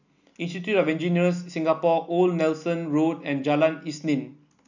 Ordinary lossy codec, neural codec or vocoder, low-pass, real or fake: none; none; 7.2 kHz; real